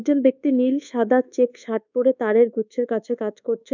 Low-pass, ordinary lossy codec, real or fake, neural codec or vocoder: 7.2 kHz; none; fake; codec, 24 kHz, 1.2 kbps, DualCodec